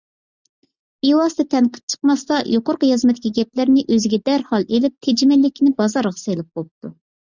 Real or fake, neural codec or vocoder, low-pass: real; none; 7.2 kHz